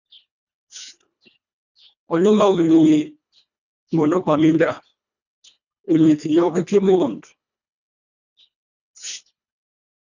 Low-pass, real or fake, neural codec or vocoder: 7.2 kHz; fake; codec, 24 kHz, 1.5 kbps, HILCodec